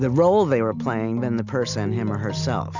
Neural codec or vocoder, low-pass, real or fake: none; 7.2 kHz; real